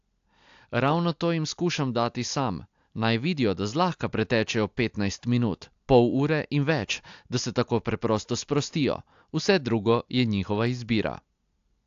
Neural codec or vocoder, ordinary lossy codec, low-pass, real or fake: none; AAC, 64 kbps; 7.2 kHz; real